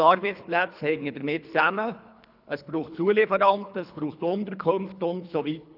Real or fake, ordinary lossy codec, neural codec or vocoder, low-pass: fake; none; codec, 24 kHz, 3 kbps, HILCodec; 5.4 kHz